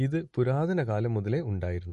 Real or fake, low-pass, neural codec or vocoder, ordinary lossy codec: real; 14.4 kHz; none; MP3, 48 kbps